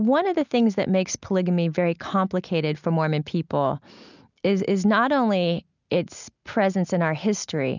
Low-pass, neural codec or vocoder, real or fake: 7.2 kHz; none; real